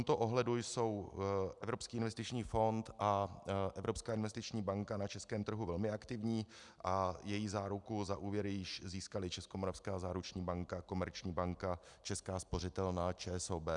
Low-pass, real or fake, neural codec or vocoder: 10.8 kHz; real; none